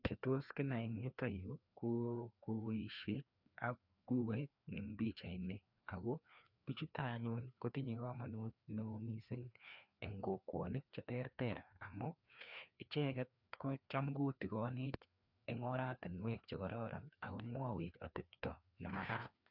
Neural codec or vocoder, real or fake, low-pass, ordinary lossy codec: codec, 16 kHz, 2 kbps, FreqCodec, larger model; fake; 5.4 kHz; none